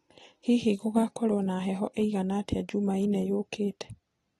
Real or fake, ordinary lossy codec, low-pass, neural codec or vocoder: real; AAC, 32 kbps; 19.8 kHz; none